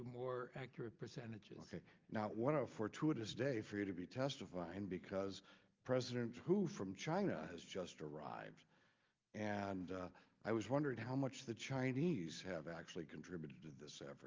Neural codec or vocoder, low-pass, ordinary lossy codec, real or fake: none; 7.2 kHz; Opus, 32 kbps; real